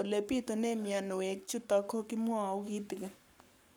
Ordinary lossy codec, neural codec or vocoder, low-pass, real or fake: none; codec, 44.1 kHz, 7.8 kbps, Pupu-Codec; none; fake